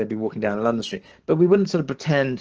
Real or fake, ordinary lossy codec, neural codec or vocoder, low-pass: fake; Opus, 16 kbps; codec, 44.1 kHz, 7.8 kbps, DAC; 7.2 kHz